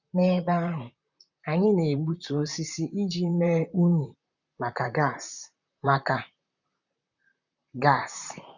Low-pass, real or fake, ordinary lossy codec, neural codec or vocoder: 7.2 kHz; fake; none; vocoder, 44.1 kHz, 128 mel bands, Pupu-Vocoder